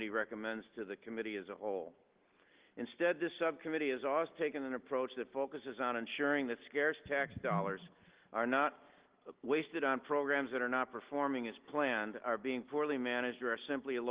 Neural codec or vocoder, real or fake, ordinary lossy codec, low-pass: none; real; Opus, 16 kbps; 3.6 kHz